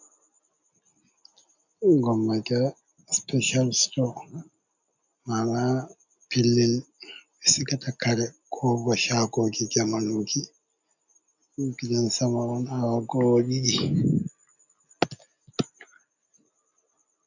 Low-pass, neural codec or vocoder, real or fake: 7.2 kHz; none; real